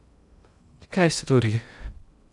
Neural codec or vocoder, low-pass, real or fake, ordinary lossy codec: codec, 16 kHz in and 24 kHz out, 0.6 kbps, FocalCodec, streaming, 2048 codes; 10.8 kHz; fake; none